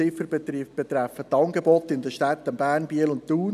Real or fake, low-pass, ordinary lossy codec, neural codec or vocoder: real; 14.4 kHz; none; none